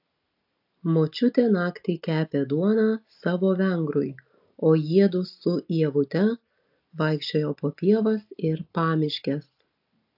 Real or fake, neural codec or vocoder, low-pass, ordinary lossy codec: real; none; 5.4 kHz; AAC, 48 kbps